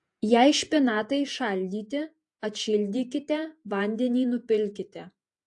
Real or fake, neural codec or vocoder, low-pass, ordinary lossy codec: fake; vocoder, 48 kHz, 128 mel bands, Vocos; 10.8 kHz; AAC, 64 kbps